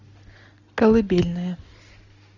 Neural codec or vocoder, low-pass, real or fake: none; 7.2 kHz; real